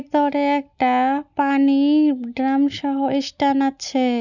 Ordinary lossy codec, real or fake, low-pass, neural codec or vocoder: none; real; 7.2 kHz; none